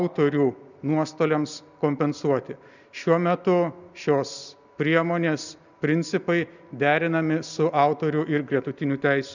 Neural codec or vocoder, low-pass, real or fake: none; 7.2 kHz; real